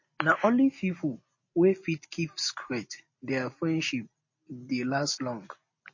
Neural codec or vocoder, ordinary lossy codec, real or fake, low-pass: none; MP3, 32 kbps; real; 7.2 kHz